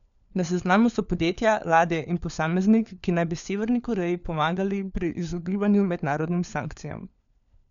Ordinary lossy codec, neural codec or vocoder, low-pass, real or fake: none; codec, 16 kHz, 4 kbps, FunCodec, trained on LibriTTS, 50 frames a second; 7.2 kHz; fake